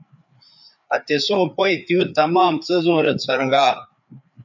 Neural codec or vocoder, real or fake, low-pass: codec, 16 kHz, 4 kbps, FreqCodec, larger model; fake; 7.2 kHz